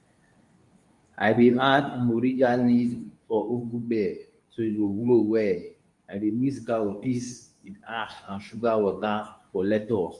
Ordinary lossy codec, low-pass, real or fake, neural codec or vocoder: none; 10.8 kHz; fake; codec, 24 kHz, 0.9 kbps, WavTokenizer, medium speech release version 2